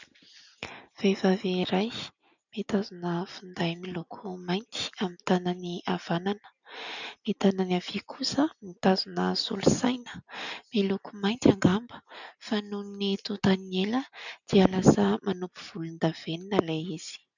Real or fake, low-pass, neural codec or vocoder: real; 7.2 kHz; none